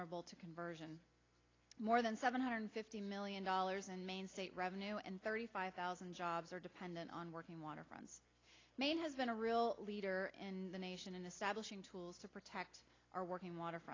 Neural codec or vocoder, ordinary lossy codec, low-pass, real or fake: none; AAC, 32 kbps; 7.2 kHz; real